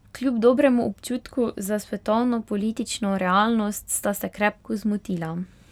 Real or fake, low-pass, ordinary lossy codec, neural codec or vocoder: real; 19.8 kHz; none; none